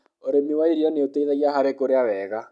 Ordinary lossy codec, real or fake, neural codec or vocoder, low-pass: none; real; none; none